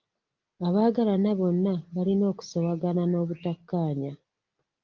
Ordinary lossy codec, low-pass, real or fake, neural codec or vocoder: Opus, 32 kbps; 7.2 kHz; real; none